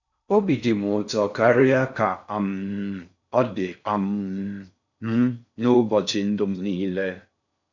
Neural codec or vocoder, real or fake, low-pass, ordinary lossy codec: codec, 16 kHz in and 24 kHz out, 0.6 kbps, FocalCodec, streaming, 4096 codes; fake; 7.2 kHz; none